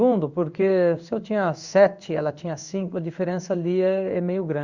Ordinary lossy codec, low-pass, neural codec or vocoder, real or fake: Opus, 64 kbps; 7.2 kHz; codec, 16 kHz in and 24 kHz out, 1 kbps, XY-Tokenizer; fake